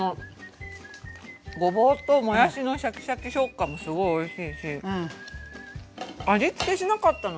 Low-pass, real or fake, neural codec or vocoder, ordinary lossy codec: none; real; none; none